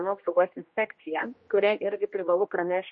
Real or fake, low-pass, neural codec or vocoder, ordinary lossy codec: fake; 7.2 kHz; codec, 16 kHz, 1 kbps, X-Codec, HuBERT features, trained on general audio; MP3, 32 kbps